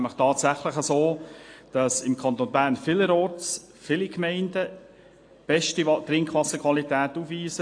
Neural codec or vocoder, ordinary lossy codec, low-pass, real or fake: none; AAC, 48 kbps; 9.9 kHz; real